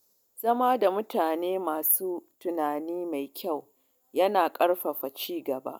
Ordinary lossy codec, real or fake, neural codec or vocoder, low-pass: none; real; none; none